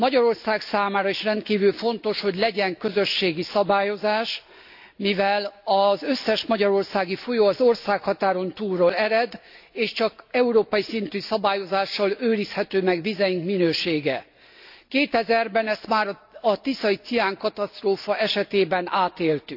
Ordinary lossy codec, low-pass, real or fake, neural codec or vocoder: AAC, 48 kbps; 5.4 kHz; real; none